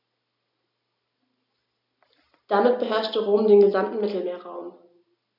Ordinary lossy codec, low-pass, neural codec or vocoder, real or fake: none; 5.4 kHz; none; real